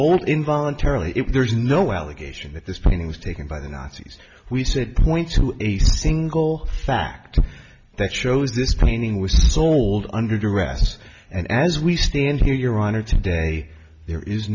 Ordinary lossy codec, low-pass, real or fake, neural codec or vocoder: MP3, 64 kbps; 7.2 kHz; real; none